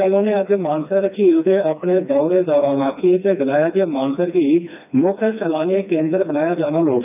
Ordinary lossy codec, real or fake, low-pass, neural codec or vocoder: none; fake; 3.6 kHz; codec, 16 kHz, 2 kbps, FreqCodec, smaller model